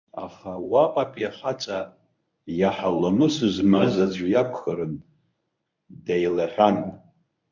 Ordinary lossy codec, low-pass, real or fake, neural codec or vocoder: MP3, 64 kbps; 7.2 kHz; fake; codec, 24 kHz, 0.9 kbps, WavTokenizer, medium speech release version 1